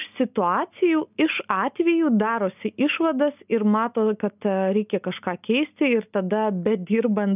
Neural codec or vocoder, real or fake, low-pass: none; real; 3.6 kHz